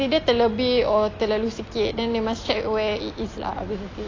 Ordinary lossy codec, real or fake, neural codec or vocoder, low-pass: AAC, 32 kbps; real; none; 7.2 kHz